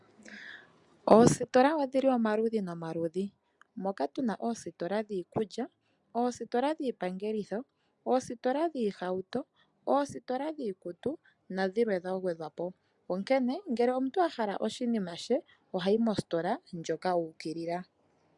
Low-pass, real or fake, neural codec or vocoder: 10.8 kHz; real; none